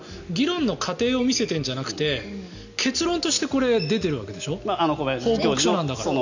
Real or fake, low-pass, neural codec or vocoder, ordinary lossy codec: real; 7.2 kHz; none; none